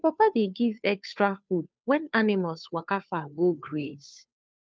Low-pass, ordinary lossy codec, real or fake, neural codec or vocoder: none; none; fake; codec, 16 kHz, 2 kbps, FunCodec, trained on Chinese and English, 25 frames a second